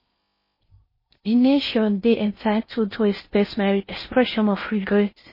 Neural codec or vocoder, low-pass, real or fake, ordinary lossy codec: codec, 16 kHz in and 24 kHz out, 0.6 kbps, FocalCodec, streaming, 4096 codes; 5.4 kHz; fake; MP3, 24 kbps